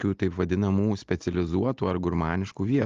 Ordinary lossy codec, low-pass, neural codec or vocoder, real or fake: Opus, 32 kbps; 7.2 kHz; none; real